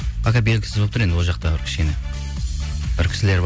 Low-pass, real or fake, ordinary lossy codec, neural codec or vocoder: none; real; none; none